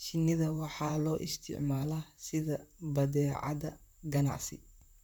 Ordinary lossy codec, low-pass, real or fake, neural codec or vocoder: none; none; fake; vocoder, 44.1 kHz, 128 mel bands, Pupu-Vocoder